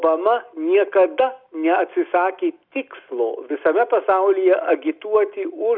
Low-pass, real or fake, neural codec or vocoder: 5.4 kHz; real; none